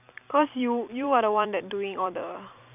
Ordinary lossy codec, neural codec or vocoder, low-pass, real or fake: none; vocoder, 44.1 kHz, 128 mel bands every 256 samples, BigVGAN v2; 3.6 kHz; fake